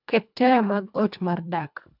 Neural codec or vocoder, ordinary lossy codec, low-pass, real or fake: codec, 24 kHz, 1.5 kbps, HILCodec; none; 5.4 kHz; fake